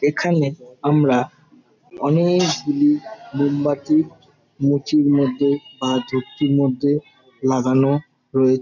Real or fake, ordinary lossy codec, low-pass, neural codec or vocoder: real; none; 7.2 kHz; none